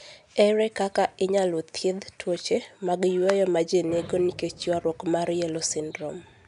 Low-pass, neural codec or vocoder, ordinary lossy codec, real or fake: 10.8 kHz; none; none; real